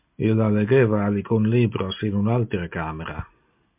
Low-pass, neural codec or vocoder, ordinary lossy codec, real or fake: 3.6 kHz; none; MP3, 32 kbps; real